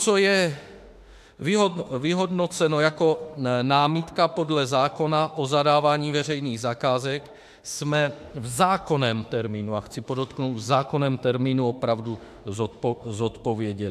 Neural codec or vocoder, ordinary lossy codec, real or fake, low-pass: autoencoder, 48 kHz, 32 numbers a frame, DAC-VAE, trained on Japanese speech; AAC, 96 kbps; fake; 14.4 kHz